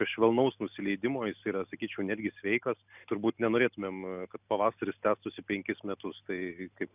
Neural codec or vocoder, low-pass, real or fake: none; 3.6 kHz; real